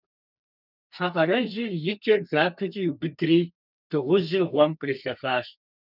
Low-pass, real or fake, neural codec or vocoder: 5.4 kHz; fake; codec, 32 kHz, 1.9 kbps, SNAC